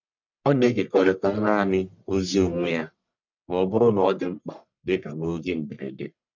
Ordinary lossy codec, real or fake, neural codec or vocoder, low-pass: none; fake; codec, 44.1 kHz, 1.7 kbps, Pupu-Codec; 7.2 kHz